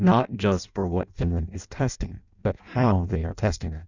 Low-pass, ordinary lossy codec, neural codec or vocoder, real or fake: 7.2 kHz; Opus, 64 kbps; codec, 16 kHz in and 24 kHz out, 0.6 kbps, FireRedTTS-2 codec; fake